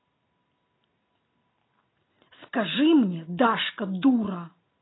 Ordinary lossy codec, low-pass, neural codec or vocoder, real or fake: AAC, 16 kbps; 7.2 kHz; none; real